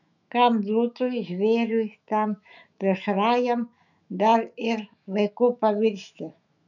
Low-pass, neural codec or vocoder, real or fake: 7.2 kHz; autoencoder, 48 kHz, 128 numbers a frame, DAC-VAE, trained on Japanese speech; fake